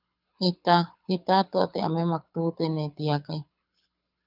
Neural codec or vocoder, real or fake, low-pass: codec, 24 kHz, 6 kbps, HILCodec; fake; 5.4 kHz